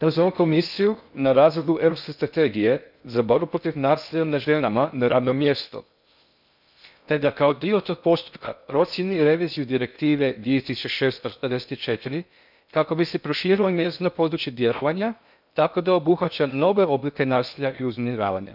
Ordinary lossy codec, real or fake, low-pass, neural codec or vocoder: none; fake; 5.4 kHz; codec, 16 kHz in and 24 kHz out, 0.6 kbps, FocalCodec, streaming, 2048 codes